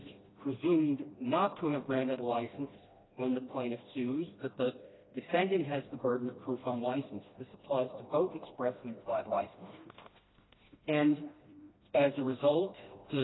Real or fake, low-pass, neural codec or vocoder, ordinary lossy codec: fake; 7.2 kHz; codec, 16 kHz, 1 kbps, FreqCodec, smaller model; AAC, 16 kbps